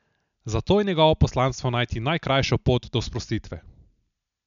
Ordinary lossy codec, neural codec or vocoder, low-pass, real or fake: none; none; 7.2 kHz; real